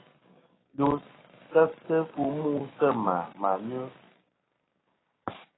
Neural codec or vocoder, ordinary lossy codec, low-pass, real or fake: codec, 16 kHz, 6 kbps, DAC; AAC, 16 kbps; 7.2 kHz; fake